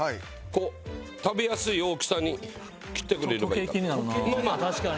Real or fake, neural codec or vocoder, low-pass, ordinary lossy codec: real; none; none; none